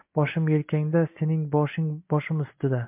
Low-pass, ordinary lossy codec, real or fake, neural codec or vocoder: 3.6 kHz; MP3, 32 kbps; real; none